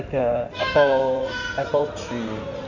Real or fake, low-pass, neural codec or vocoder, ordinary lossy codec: fake; 7.2 kHz; codec, 16 kHz in and 24 kHz out, 2.2 kbps, FireRedTTS-2 codec; none